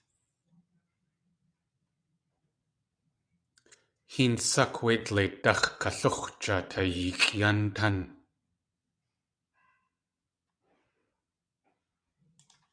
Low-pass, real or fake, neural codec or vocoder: 9.9 kHz; fake; vocoder, 22.05 kHz, 80 mel bands, WaveNeXt